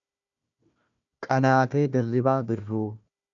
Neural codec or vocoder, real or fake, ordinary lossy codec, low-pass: codec, 16 kHz, 1 kbps, FunCodec, trained on Chinese and English, 50 frames a second; fake; AAC, 64 kbps; 7.2 kHz